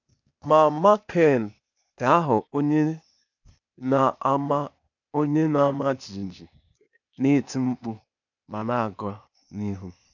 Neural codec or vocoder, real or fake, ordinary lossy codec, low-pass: codec, 16 kHz, 0.8 kbps, ZipCodec; fake; none; 7.2 kHz